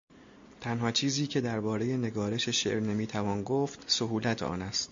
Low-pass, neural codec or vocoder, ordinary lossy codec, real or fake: 7.2 kHz; none; MP3, 48 kbps; real